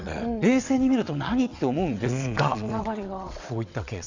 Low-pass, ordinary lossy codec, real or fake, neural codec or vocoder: 7.2 kHz; Opus, 64 kbps; fake; vocoder, 22.05 kHz, 80 mel bands, WaveNeXt